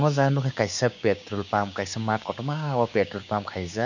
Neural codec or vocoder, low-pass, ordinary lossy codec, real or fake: codec, 16 kHz, 6 kbps, DAC; 7.2 kHz; none; fake